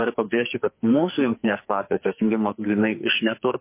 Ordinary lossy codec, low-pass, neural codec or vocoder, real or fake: MP3, 24 kbps; 3.6 kHz; codec, 44.1 kHz, 2.6 kbps, SNAC; fake